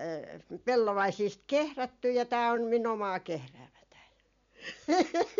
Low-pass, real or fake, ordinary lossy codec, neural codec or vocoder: 7.2 kHz; real; MP3, 64 kbps; none